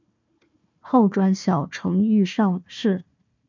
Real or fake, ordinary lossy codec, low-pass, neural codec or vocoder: fake; MP3, 64 kbps; 7.2 kHz; codec, 16 kHz, 1 kbps, FunCodec, trained on Chinese and English, 50 frames a second